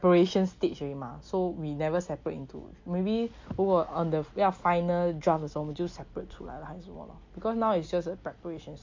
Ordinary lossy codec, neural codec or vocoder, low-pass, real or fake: MP3, 48 kbps; none; 7.2 kHz; real